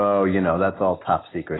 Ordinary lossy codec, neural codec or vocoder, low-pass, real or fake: AAC, 16 kbps; none; 7.2 kHz; real